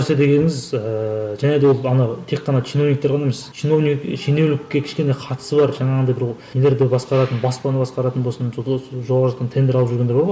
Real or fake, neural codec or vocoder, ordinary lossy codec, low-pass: real; none; none; none